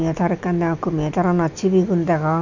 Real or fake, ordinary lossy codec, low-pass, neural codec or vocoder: real; none; 7.2 kHz; none